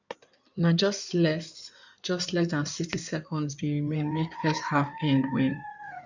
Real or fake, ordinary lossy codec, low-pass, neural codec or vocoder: fake; none; 7.2 kHz; codec, 16 kHz in and 24 kHz out, 2.2 kbps, FireRedTTS-2 codec